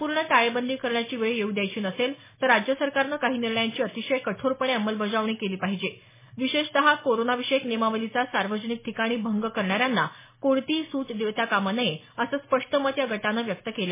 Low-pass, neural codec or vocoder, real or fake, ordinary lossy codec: 3.6 kHz; none; real; MP3, 16 kbps